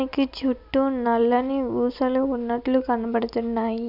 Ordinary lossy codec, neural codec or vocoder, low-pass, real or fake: none; none; 5.4 kHz; real